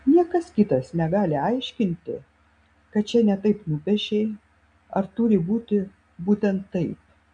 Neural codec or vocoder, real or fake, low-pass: none; real; 9.9 kHz